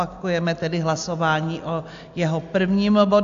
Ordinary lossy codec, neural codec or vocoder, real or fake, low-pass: AAC, 64 kbps; none; real; 7.2 kHz